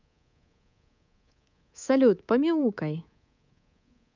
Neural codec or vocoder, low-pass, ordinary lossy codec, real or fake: codec, 24 kHz, 3.1 kbps, DualCodec; 7.2 kHz; none; fake